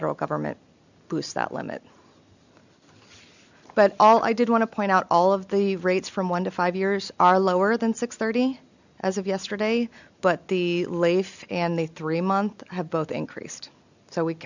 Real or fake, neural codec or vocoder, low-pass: real; none; 7.2 kHz